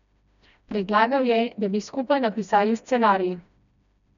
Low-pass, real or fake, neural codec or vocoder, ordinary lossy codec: 7.2 kHz; fake; codec, 16 kHz, 1 kbps, FreqCodec, smaller model; none